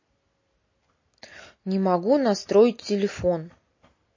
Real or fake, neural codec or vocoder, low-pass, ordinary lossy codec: real; none; 7.2 kHz; MP3, 32 kbps